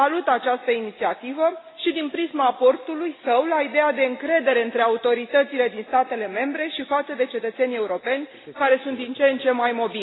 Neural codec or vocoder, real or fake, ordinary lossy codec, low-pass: none; real; AAC, 16 kbps; 7.2 kHz